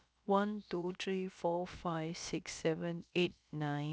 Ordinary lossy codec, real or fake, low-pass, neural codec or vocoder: none; fake; none; codec, 16 kHz, about 1 kbps, DyCAST, with the encoder's durations